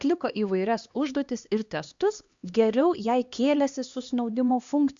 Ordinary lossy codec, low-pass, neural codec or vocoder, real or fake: Opus, 64 kbps; 7.2 kHz; codec, 16 kHz, 2 kbps, X-Codec, HuBERT features, trained on LibriSpeech; fake